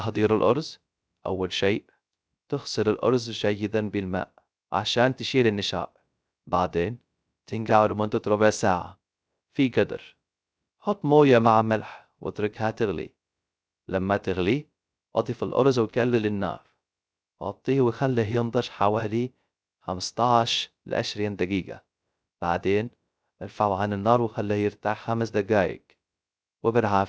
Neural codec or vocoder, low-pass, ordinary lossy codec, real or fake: codec, 16 kHz, 0.3 kbps, FocalCodec; none; none; fake